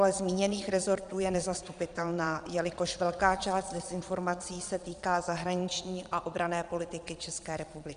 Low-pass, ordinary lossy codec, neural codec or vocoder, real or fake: 9.9 kHz; MP3, 96 kbps; vocoder, 22.05 kHz, 80 mel bands, Vocos; fake